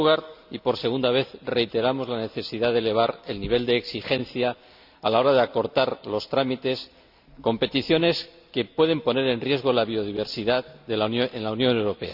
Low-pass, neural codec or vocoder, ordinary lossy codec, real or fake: 5.4 kHz; none; none; real